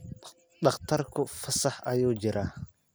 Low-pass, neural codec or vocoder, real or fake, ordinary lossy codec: none; none; real; none